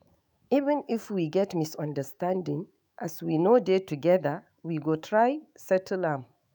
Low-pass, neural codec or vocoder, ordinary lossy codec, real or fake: none; autoencoder, 48 kHz, 128 numbers a frame, DAC-VAE, trained on Japanese speech; none; fake